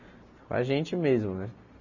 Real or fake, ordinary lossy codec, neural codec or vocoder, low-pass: real; none; none; 7.2 kHz